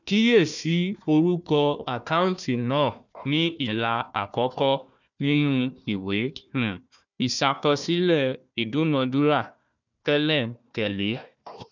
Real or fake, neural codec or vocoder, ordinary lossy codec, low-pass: fake; codec, 16 kHz, 1 kbps, FunCodec, trained on Chinese and English, 50 frames a second; none; 7.2 kHz